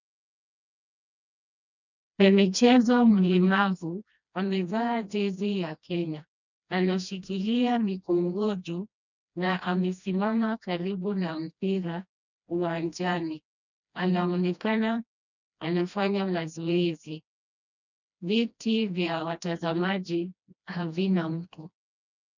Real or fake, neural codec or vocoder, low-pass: fake; codec, 16 kHz, 1 kbps, FreqCodec, smaller model; 7.2 kHz